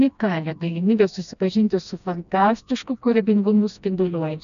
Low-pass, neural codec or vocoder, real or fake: 7.2 kHz; codec, 16 kHz, 1 kbps, FreqCodec, smaller model; fake